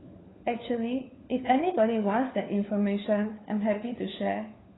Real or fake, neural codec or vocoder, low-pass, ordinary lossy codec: fake; codec, 16 kHz, 4 kbps, FunCodec, trained on LibriTTS, 50 frames a second; 7.2 kHz; AAC, 16 kbps